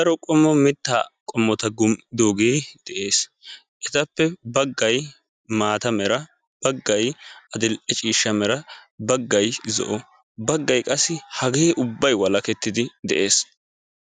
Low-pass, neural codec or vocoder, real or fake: 9.9 kHz; none; real